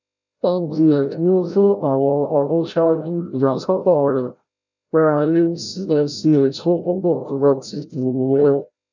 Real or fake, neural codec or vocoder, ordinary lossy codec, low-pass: fake; codec, 16 kHz, 0.5 kbps, FreqCodec, larger model; none; 7.2 kHz